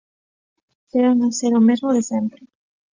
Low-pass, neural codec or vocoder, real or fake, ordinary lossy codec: 7.2 kHz; none; real; Opus, 32 kbps